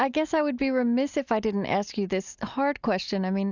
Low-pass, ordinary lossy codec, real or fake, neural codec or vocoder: 7.2 kHz; Opus, 64 kbps; real; none